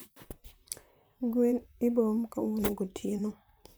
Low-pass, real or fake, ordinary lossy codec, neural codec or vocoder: none; fake; none; vocoder, 44.1 kHz, 128 mel bands, Pupu-Vocoder